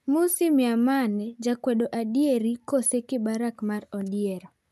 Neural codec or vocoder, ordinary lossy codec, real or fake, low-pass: none; none; real; 14.4 kHz